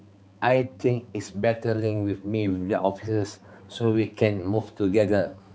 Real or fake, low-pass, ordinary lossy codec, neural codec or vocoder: fake; none; none; codec, 16 kHz, 4 kbps, X-Codec, HuBERT features, trained on balanced general audio